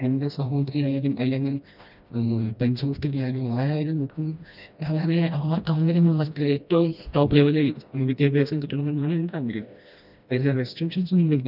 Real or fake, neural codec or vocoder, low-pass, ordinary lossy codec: fake; codec, 16 kHz, 1 kbps, FreqCodec, smaller model; 5.4 kHz; none